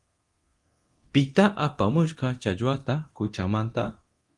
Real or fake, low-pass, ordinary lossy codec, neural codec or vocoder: fake; 10.8 kHz; Opus, 24 kbps; codec, 24 kHz, 0.9 kbps, DualCodec